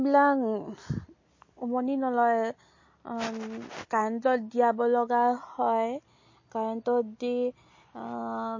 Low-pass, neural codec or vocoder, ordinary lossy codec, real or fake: 7.2 kHz; none; MP3, 32 kbps; real